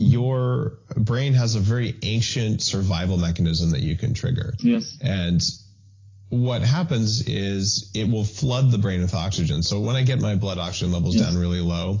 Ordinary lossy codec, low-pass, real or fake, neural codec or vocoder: AAC, 32 kbps; 7.2 kHz; real; none